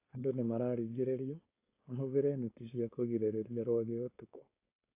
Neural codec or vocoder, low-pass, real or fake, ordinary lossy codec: codec, 16 kHz, 4.8 kbps, FACodec; 3.6 kHz; fake; AAC, 24 kbps